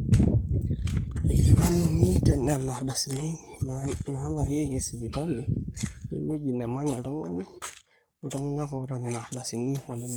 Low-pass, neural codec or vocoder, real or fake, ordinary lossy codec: none; codec, 44.1 kHz, 3.4 kbps, Pupu-Codec; fake; none